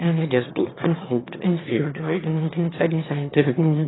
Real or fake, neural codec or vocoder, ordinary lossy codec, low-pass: fake; autoencoder, 22.05 kHz, a latent of 192 numbers a frame, VITS, trained on one speaker; AAC, 16 kbps; 7.2 kHz